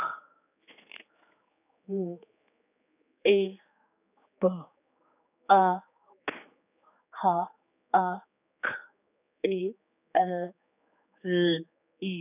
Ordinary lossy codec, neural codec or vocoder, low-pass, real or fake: none; codec, 16 kHz, 4 kbps, X-Codec, HuBERT features, trained on general audio; 3.6 kHz; fake